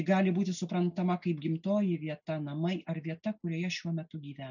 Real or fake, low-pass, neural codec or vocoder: real; 7.2 kHz; none